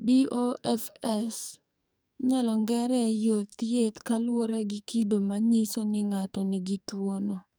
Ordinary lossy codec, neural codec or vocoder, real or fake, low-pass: none; codec, 44.1 kHz, 2.6 kbps, SNAC; fake; none